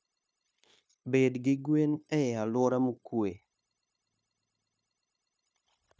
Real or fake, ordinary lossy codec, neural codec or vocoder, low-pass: fake; none; codec, 16 kHz, 0.9 kbps, LongCat-Audio-Codec; none